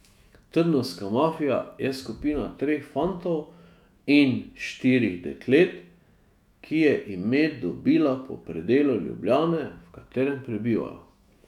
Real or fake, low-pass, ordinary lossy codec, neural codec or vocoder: fake; 19.8 kHz; none; autoencoder, 48 kHz, 128 numbers a frame, DAC-VAE, trained on Japanese speech